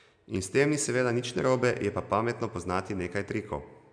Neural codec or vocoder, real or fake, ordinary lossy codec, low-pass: none; real; MP3, 96 kbps; 9.9 kHz